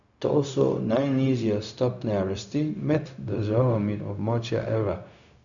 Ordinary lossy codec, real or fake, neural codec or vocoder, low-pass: none; fake; codec, 16 kHz, 0.4 kbps, LongCat-Audio-Codec; 7.2 kHz